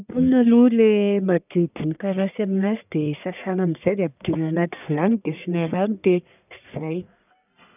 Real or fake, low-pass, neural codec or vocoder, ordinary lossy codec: fake; 3.6 kHz; codec, 44.1 kHz, 1.7 kbps, Pupu-Codec; none